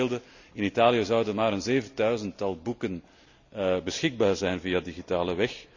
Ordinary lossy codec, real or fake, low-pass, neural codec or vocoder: none; real; 7.2 kHz; none